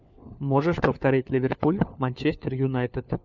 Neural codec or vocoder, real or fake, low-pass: codec, 16 kHz, 4 kbps, FunCodec, trained on LibriTTS, 50 frames a second; fake; 7.2 kHz